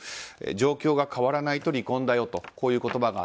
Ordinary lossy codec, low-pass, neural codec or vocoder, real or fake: none; none; none; real